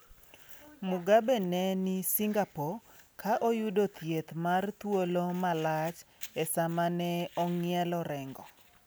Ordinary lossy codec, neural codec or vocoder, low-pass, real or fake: none; none; none; real